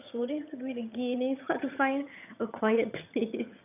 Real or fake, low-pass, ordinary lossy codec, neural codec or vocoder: fake; 3.6 kHz; none; vocoder, 22.05 kHz, 80 mel bands, HiFi-GAN